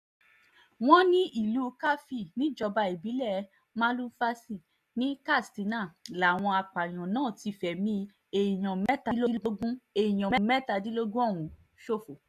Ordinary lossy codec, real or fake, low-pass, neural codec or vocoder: none; real; 14.4 kHz; none